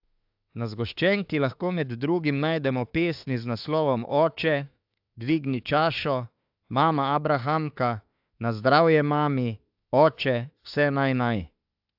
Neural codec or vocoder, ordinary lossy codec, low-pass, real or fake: autoencoder, 48 kHz, 32 numbers a frame, DAC-VAE, trained on Japanese speech; AAC, 48 kbps; 5.4 kHz; fake